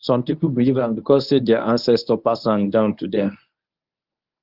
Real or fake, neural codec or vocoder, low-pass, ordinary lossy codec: fake; codec, 24 kHz, 0.9 kbps, WavTokenizer, medium speech release version 1; 5.4 kHz; Opus, 24 kbps